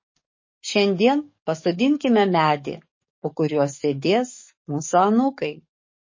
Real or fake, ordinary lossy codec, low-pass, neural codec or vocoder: fake; MP3, 32 kbps; 7.2 kHz; codec, 44.1 kHz, 7.8 kbps, DAC